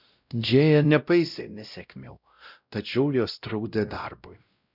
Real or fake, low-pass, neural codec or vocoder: fake; 5.4 kHz; codec, 16 kHz, 0.5 kbps, X-Codec, WavLM features, trained on Multilingual LibriSpeech